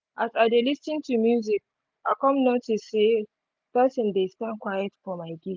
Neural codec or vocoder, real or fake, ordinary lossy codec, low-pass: none; real; none; none